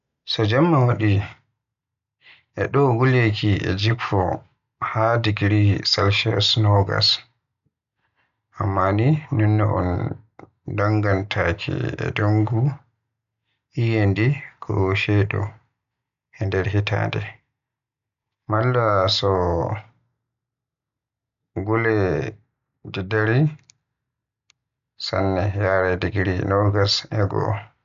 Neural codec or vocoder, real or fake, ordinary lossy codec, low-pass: none; real; none; 7.2 kHz